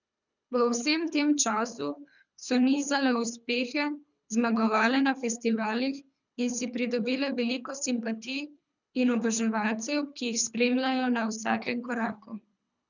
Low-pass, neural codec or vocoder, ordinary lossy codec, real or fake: 7.2 kHz; codec, 24 kHz, 3 kbps, HILCodec; none; fake